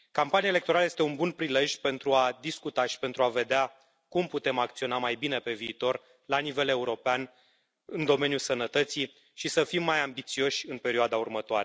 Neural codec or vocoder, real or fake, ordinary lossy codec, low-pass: none; real; none; none